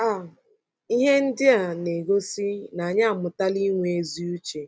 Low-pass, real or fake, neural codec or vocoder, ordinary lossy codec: none; real; none; none